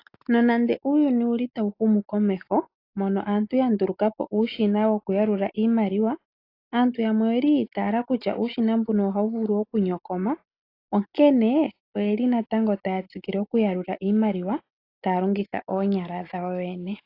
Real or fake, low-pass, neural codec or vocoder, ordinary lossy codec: real; 5.4 kHz; none; AAC, 32 kbps